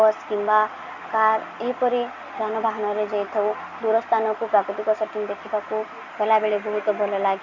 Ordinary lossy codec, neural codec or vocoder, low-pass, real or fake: Opus, 64 kbps; none; 7.2 kHz; real